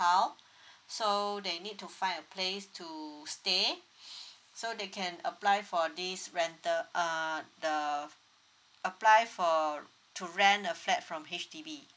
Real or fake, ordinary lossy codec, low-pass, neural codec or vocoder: real; none; none; none